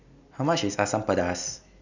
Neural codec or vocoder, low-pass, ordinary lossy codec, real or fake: none; 7.2 kHz; none; real